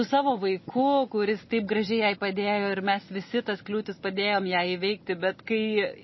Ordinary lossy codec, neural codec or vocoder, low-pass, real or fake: MP3, 24 kbps; none; 7.2 kHz; real